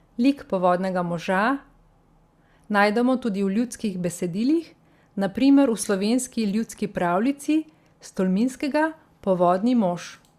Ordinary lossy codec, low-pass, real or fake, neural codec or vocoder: Opus, 64 kbps; 14.4 kHz; real; none